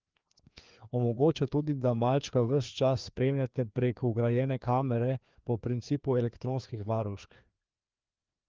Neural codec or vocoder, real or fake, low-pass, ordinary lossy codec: codec, 16 kHz, 2 kbps, FreqCodec, larger model; fake; 7.2 kHz; Opus, 32 kbps